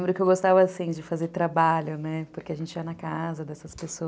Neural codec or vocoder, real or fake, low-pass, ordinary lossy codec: none; real; none; none